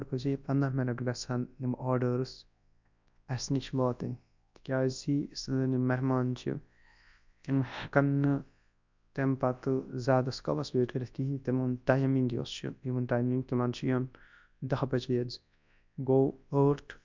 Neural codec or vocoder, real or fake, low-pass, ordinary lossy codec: codec, 24 kHz, 0.9 kbps, WavTokenizer, large speech release; fake; 7.2 kHz; MP3, 64 kbps